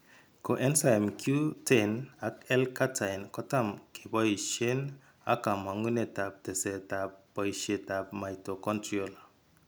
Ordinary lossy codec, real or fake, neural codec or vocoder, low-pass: none; real; none; none